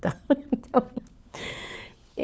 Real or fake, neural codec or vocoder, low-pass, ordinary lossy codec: fake; codec, 16 kHz, 4 kbps, FunCodec, trained on LibriTTS, 50 frames a second; none; none